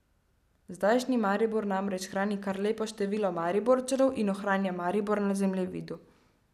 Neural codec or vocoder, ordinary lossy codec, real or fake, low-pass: none; none; real; 14.4 kHz